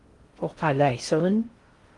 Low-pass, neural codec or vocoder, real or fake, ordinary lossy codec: 10.8 kHz; codec, 16 kHz in and 24 kHz out, 0.6 kbps, FocalCodec, streaming, 2048 codes; fake; Opus, 24 kbps